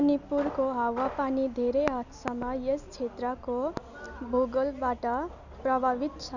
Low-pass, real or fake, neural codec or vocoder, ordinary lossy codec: 7.2 kHz; real; none; none